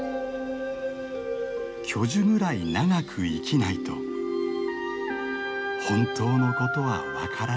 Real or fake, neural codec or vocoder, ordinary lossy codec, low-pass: real; none; none; none